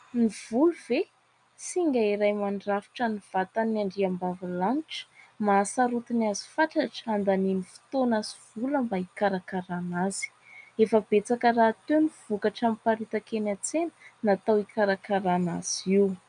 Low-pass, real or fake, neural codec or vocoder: 9.9 kHz; real; none